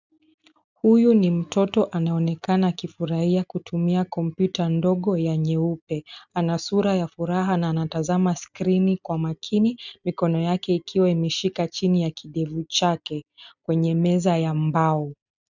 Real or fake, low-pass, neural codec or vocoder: real; 7.2 kHz; none